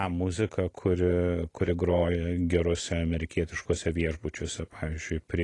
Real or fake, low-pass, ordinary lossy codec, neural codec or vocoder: real; 10.8 kHz; AAC, 32 kbps; none